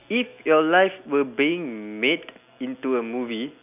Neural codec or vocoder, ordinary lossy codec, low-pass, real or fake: none; none; 3.6 kHz; real